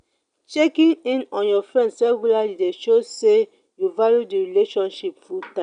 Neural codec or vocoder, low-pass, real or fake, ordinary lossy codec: none; 9.9 kHz; real; none